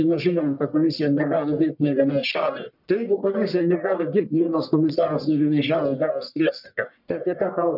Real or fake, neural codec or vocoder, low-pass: fake; codec, 44.1 kHz, 1.7 kbps, Pupu-Codec; 5.4 kHz